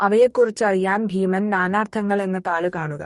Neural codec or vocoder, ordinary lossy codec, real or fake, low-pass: codec, 44.1 kHz, 2.6 kbps, DAC; MP3, 48 kbps; fake; 19.8 kHz